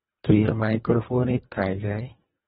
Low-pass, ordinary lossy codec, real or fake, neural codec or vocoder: 10.8 kHz; AAC, 16 kbps; fake; codec, 24 kHz, 1.5 kbps, HILCodec